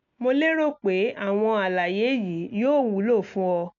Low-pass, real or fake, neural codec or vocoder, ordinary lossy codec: 7.2 kHz; real; none; none